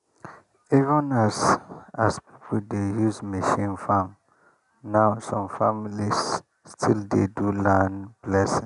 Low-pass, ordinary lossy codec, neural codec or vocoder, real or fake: 10.8 kHz; MP3, 96 kbps; none; real